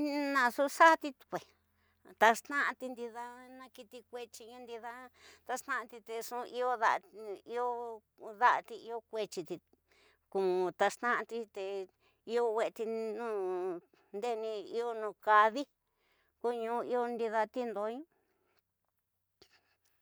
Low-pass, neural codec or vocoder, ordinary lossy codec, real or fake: none; none; none; real